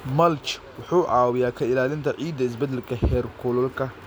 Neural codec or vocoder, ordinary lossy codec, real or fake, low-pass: none; none; real; none